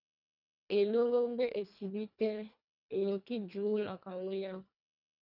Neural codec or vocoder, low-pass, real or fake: codec, 24 kHz, 1.5 kbps, HILCodec; 5.4 kHz; fake